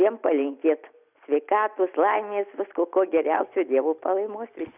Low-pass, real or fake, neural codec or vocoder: 3.6 kHz; real; none